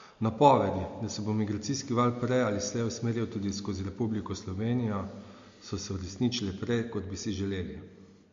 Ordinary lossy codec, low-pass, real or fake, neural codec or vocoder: MP3, 48 kbps; 7.2 kHz; real; none